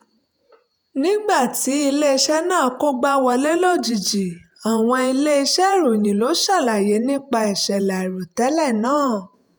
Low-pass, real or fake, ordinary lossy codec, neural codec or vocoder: none; fake; none; vocoder, 48 kHz, 128 mel bands, Vocos